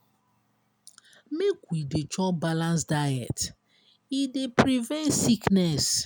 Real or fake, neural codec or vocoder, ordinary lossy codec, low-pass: real; none; none; none